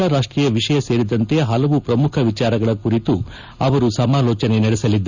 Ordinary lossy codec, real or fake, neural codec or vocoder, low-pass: Opus, 64 kbps; real; none; 7.2 kHz